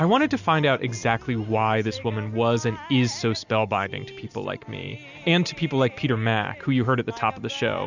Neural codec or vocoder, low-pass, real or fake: none; 7.2 kHz; real